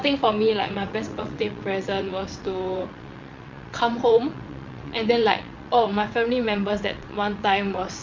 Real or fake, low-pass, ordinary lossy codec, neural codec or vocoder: fake; 7.2 kHz; MP3, 48 kbps; vocoder, 22.05 kHz, 80 mel bands, WaveNeXt